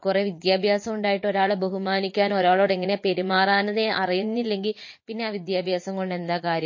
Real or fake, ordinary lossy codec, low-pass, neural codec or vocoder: fake; MP3, 32 kbps; 7.2 kHz; vocoder, 44.1 kHz, 80 mel bands, Vocos